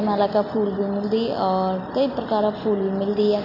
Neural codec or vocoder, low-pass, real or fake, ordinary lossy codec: none; 5.4 kHz; real; none